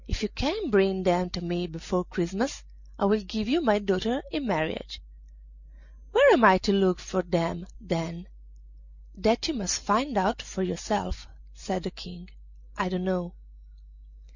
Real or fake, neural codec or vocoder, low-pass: real; none; 7.2 kHz